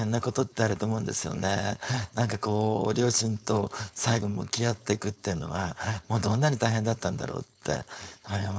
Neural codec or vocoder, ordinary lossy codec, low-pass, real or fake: codec, 16 kHz, 4.8 kbps, FACodec; none; none; fake